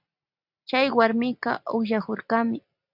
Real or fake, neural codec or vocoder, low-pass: real; none; 5.4 kHz